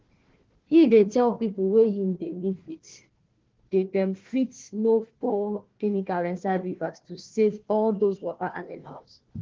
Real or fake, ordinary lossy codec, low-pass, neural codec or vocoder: fake; Opus, 16 kbps; 7.2 kHz; codec, 16 kHz, 1 kbps, FunCodec, trained on Chinese and English, 50 frames a second